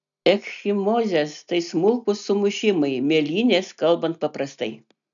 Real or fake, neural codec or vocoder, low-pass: real; none; 7.2 kHz